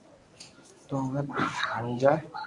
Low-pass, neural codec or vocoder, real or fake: 10.8 kHz; codec, 24 kHz, 0.9 kbps, WavTokenizer, medium speech release version 1; fake